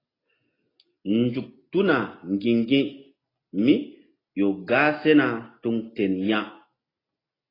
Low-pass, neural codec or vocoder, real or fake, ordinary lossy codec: 5.4 kHz; none; real; AAC, 24 kbps